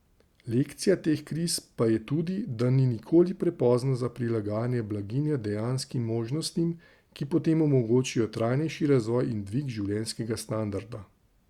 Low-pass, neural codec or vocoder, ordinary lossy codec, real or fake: 19.8 kHz; none; Opus, 64 kbps; real